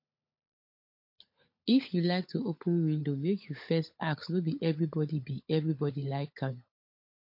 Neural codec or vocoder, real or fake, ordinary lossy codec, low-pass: codec, 16 kHz, 16 kbps, FunCodec, trained on LibriTTS, 50 frames a second; fake; MP3, 32 kbps; 5.4 kHz